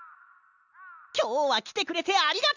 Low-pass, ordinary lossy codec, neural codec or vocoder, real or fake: 7.2 kHz; none; none; real